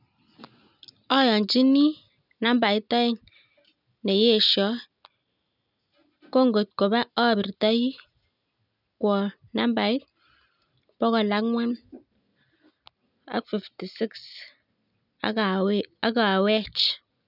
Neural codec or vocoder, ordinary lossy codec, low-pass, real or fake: none; none; 5.4 kHz; real